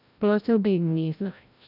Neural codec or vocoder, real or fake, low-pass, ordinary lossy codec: codec, 16 kHz, 0.5 kbps, FreqCodec, larger model; fake; 5.4 kHz; none